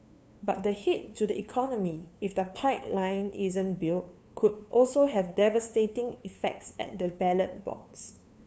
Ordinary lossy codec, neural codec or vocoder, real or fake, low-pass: none; codec, 16 kHz, 2 kbps, FunCodec, trained on LibriTTS, 25 frames a second; fake; none